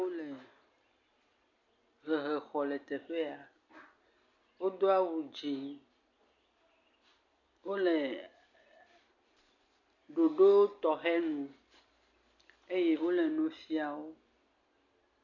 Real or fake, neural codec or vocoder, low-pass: real; none; 7.2 kHz